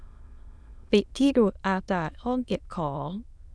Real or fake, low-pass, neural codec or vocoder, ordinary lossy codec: fake; none; autoencoder, 22.05 kHz, a latent of 192 numbers a frame, VITS, trained on many speakers; none